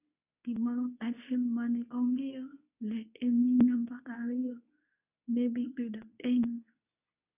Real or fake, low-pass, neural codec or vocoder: fake; 3.6 kHz; codec, 24 kHz, 0.9 kbps, WavTokenizer, medium speech release version 2